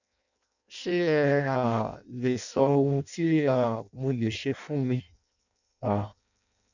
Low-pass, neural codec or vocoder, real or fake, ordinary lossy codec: 7.2 kHz; codec, 16 kHz in and 24 kHz out, 0.6 kbps, FireRedTTS-2 codec; fake; none